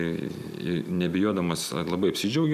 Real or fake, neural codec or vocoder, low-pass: real; none; 14.4 kHz